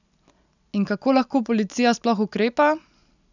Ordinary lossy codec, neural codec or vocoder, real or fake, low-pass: none; none; real; 7.2 kHz